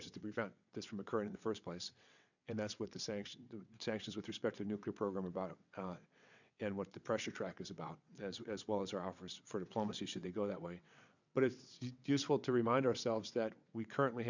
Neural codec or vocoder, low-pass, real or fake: vocoder, 22.05 kHz, 80 mel bands, WaveNeXt; 7.2 kHz; fake